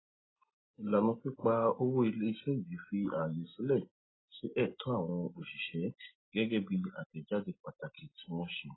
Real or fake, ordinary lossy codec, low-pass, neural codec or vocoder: real; AAC, 16 kbps; 7.2 kHz; none